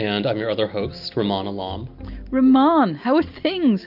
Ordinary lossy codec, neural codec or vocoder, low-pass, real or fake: AAC, 48 kbps; none; 5.4 kHz; real